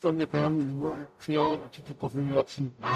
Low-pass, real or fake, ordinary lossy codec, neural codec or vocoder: 14.4 kHz; fake; AAC, 96 kbps; codec, 44.1 kHz, 0.9 kbps, DAC